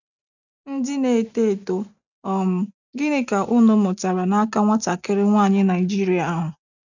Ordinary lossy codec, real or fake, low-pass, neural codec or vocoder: none; real; 7.2 kHz; none